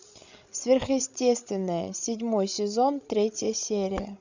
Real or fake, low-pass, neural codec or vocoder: fake; 7.2 kHz; codec, 16 kHz, 16 kbps, FreqCodec, larger model